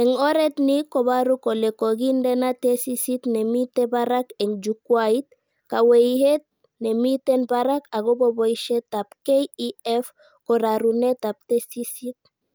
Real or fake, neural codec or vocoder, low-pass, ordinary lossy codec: real; none; none; none